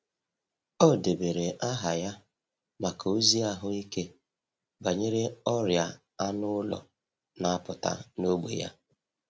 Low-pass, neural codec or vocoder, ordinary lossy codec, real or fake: none; none; none; real